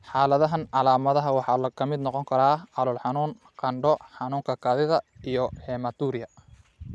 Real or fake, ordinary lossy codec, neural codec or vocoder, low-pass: real; none; none; none